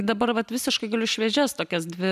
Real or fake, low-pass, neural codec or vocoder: real; 14.4 kHz; none